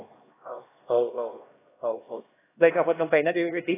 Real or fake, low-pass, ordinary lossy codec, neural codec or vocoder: fake; 3.6 kHz; AAC, 16 kbps; codec, 16 kHz, 1 kbps, X-Codec, HuBERT features, trained on LibriSpeech